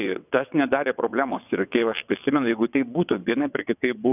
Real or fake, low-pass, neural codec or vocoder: fake; 3.6 kHz; vocoder, 22.05 kHz, 80 mel bands, WaveNeXt